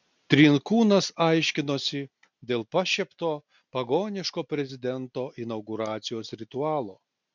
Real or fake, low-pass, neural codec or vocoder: real; 7.2 kHz; none